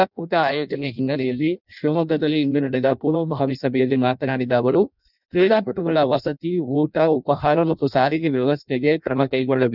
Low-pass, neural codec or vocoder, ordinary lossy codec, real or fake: 5.4 kHz; codec, 16 kHz in and 24 kHz out, 0.6 kbps, FireRedTTS-2 codec; none; fake